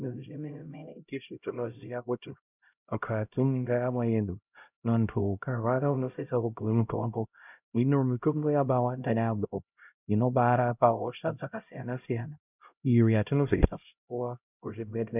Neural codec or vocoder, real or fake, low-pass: codec, 16 kHz, 0.5 kbps, X-Codec, HuBERT features, trained on LibriSpeech; fake; 3.6 kHz